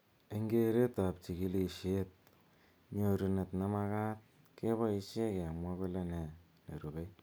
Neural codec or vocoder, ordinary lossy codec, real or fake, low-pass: none; none; real; none